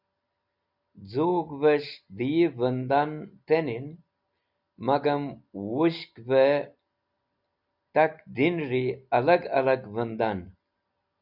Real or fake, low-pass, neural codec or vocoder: real; 5.4 kHz; none